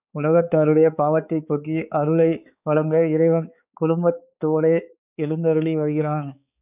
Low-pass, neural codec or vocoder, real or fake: 3.6 kHz; codec, 16 kHz, 4 kbps, X-Codec, HuBERT features, trained on balanced general audio; fake